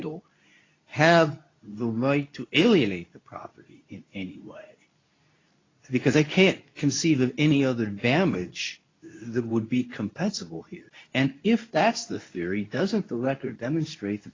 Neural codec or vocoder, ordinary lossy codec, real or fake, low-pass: codec, 24 kHz, 0.9 kbps, WavTokenizer, medium speech release version 2; AAC, 32 kbps; fake; 7.2 kHz